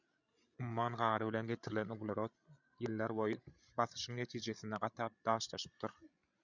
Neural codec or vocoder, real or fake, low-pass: codec, 16 kHz, 16 kbps, FreqCodec, larger model; fake; 7.2 kHz